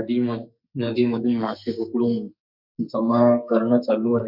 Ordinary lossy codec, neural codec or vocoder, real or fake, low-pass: none; codec, 44.1 kHz, 2.6 kbps, SNAC; fake; 5.4 kHz